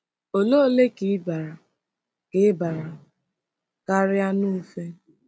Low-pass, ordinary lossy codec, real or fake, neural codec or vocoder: none; none; real; none